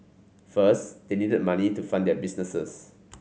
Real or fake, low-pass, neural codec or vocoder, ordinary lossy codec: real; none; none; none